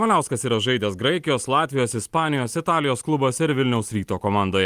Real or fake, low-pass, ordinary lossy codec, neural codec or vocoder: real; 14.4 kHz; Opus, 32 kbps; none